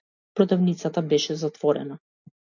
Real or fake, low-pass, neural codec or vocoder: real; 7.2 kHz; none